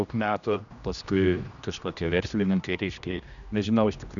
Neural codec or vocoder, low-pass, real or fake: codec, 16 kHz, 1 kbps, X-Codec, HuBERT features, trained on general audio; 7.2 kHz; fake